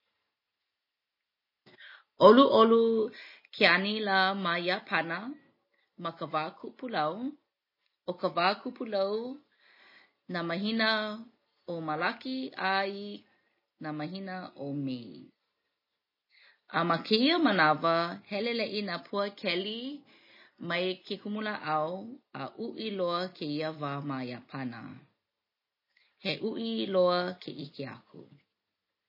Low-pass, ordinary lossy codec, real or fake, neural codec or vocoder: 5.4 kHz; MP3, 24 kbps; real; none